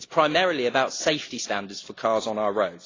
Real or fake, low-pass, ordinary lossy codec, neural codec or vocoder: real; 7.2 kHz; AAC, 32 kbps; none